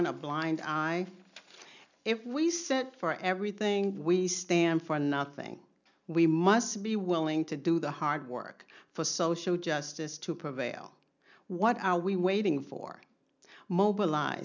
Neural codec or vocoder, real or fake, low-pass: none; real; 7.2 kHz